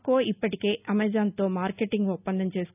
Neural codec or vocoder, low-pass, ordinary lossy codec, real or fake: none; 3.6 kHz; none; real